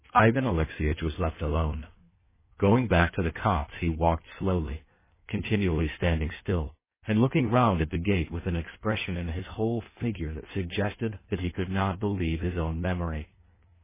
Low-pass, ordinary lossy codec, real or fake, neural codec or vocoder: 3.6 kHz; MP3, 16 kbps; fake; codec, 16 kHz in and 24 kHz out, 1.1 kbps, FireRedTTS-2 codec